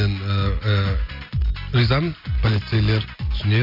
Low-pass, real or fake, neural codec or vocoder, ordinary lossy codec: 5.4 kHz; real; none; AAC, 48 kbps